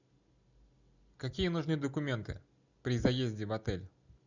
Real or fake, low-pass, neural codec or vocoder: real; 7.2 kHz; none